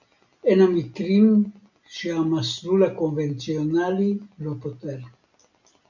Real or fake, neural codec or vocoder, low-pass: real; none; 7.2 kHz